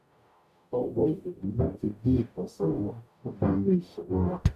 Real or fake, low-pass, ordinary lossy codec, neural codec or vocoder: fake; 14.4 kHz; none; codec, 44.1 kHz, 0.9 kbps, DAC